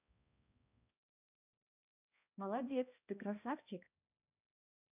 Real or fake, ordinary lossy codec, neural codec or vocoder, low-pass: fake; none; codec, 16 kHz, 1 kbps, X-Codec, HuBERT features, trained on balanced general audio; 3.6 kHz